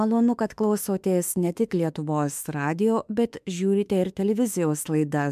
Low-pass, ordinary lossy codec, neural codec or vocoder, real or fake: 14.4 kHz; MP3, 96 kbps; autoencoder, 48 kHz, 32 numbers a frame, DAC-VAE, trained on Japanese speech; fake